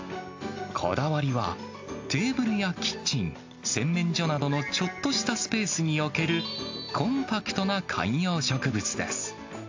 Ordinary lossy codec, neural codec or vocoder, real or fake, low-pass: AAC, 48 kbps; none; real; 7.2 kHz